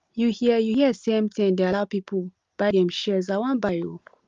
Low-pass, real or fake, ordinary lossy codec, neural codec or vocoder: 7.2 kHz; real; Opus, 32 kbps; none